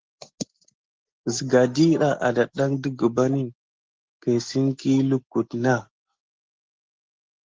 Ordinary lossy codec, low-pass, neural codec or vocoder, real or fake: Opus, 16 kbps; 7.2 kHz; none; real